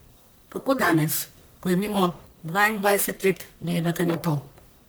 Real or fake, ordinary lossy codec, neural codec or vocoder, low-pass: fake; none; codec, 44.1 kHz, 1.7 kbps, Pupu-Codec; none